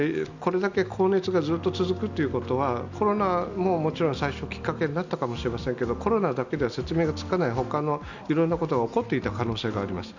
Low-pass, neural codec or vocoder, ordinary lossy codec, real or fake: 7.2 kHz; none; none; real